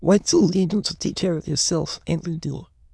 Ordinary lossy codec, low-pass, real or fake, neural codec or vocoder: none; none; fake; autoencoder, 22.05 kHz, a latent of 192 numbers a frame, VITS, trained on many speakers